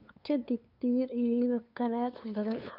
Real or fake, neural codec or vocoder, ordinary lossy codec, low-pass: fake; codec, 16 kHz, 2 kbps, FunCodec, trained on LibriTTS, 25 frames a second; none; 5.4 kHz